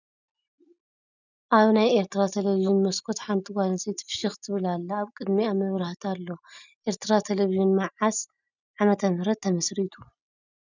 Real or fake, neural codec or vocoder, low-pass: real; none; 7.2 kHz